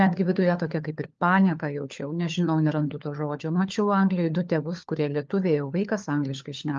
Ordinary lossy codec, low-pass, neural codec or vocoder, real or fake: Opus, 24 kbps; 7.2 kHz; codec, 16 kHz, 4 kbps, FunCodec, trained on LibriTTS, 50 frames a second; fake